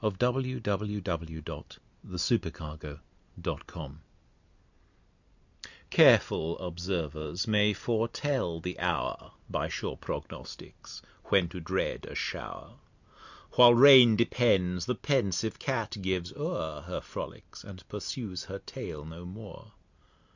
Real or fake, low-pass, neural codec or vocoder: real; 7.2 kHz; none